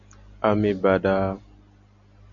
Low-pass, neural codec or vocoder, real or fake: 7.2 kHz; none; real